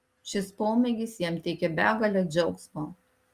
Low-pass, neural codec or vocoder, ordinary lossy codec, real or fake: 14.4 kHz; none; Opus, 32 kbps; real